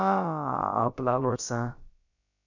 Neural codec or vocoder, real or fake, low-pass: codec, 16 kHz, about 1 kbps, DyCAST, with the encoder's durations; fake; 7.2 kHz